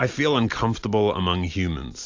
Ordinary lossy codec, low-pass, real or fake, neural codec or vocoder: AAC, 48 kbps; 7.2 kHz; real; none